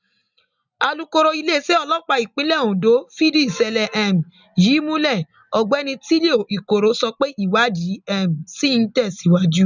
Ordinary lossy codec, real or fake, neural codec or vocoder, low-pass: none; real; none; 7.2 kHz